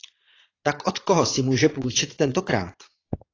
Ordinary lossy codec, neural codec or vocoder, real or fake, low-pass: AAC, 32 kbps; vocoder, 22.05 kHz, 80 mel bands, Vocos; fake; 7.2 kHz